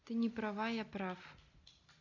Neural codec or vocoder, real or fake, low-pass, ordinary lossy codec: none; real; 7.2 kHz; none